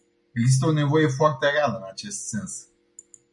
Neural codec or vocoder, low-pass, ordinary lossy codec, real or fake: none; 10.8 kHz; MP3, 96 kbps; real